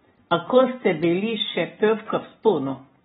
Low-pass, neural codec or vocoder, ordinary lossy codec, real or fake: 19.8 kHz; none; AAC, 16 kbps; real